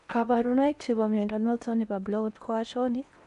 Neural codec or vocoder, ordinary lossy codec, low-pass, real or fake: codec, 16 kHz in and 24 kHz out, 0.8 kbps, FocalCodec, streaming, 65536 codes; none; 10.8 kHz; fake